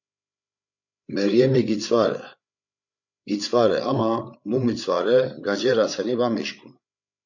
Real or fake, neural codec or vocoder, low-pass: fake; codec, 16 kHz, 8 kbps, FreqCodec, larger model; 7.2 kHz